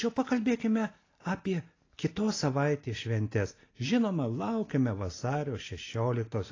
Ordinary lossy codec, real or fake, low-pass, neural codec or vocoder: AAC, 32 kbps; real; 7.2 kHz; none